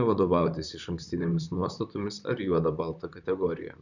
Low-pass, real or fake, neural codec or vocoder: 7.2 kHz; fake; vocoder, 44.1 kHz, 128 mel bands, Pupu-Vocoder